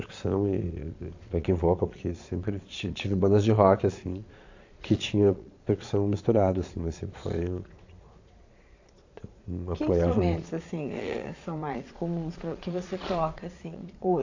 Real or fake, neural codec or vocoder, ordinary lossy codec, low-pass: fake; vocoder, 22.05 kHz, 80 mel bands, Vocos; AAC, 48 kbps; 7.2 kHz